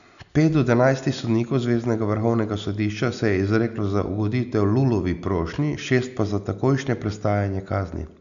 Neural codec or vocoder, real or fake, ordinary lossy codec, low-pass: none; real; none; 7.2 kHz